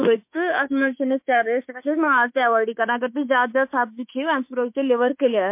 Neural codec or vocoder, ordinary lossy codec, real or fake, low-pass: autoencoder, 48 kHz, 32 numbers a frame, DAC-VAE, trained on Japanese speech; MP3, 32 kbps; fake; 3.6 kHz